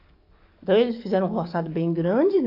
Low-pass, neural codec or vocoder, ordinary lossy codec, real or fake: 5.4 kHz; codec, 44.1 kHz, 7.8 kbps, DAC; AAC, 32 kbps; fake